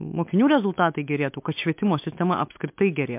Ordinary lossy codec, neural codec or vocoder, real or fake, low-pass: MP3, 32 kbps; autoencoder, 48 kHz, 128 numbers a frame, DAC-VAE, trained on Japanese speech; fake; 3.6 kHz